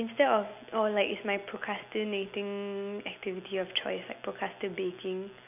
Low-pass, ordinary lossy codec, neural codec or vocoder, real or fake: 3.6 kHz; none; none; real